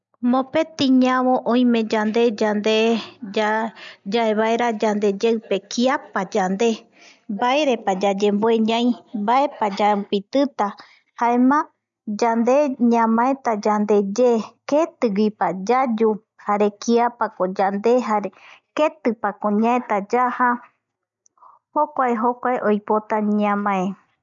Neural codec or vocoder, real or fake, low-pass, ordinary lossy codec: none; real; 7.2 kHz; none